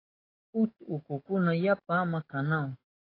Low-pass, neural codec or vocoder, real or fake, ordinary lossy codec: 5.4 kHz; codec, 44.1 kHz, 7.8 kbps, DAC; fake; AAC, 24 kbps